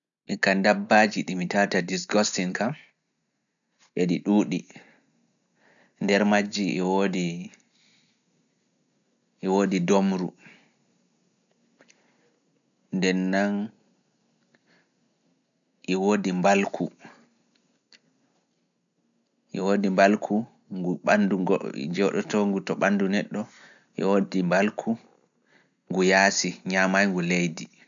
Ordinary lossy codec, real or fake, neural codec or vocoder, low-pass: none; real; none; 7.2 kHz